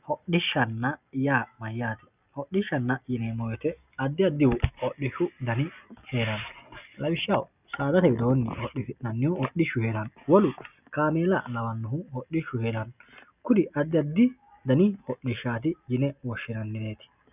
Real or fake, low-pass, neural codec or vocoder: real; 3.6 kHz; none